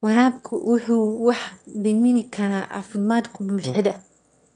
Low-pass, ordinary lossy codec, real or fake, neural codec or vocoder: 9.9 kHz; none; fake; autoencoder, 22.05 kHz, a latent of 192 numbers a frame, VITS, trained on one speaker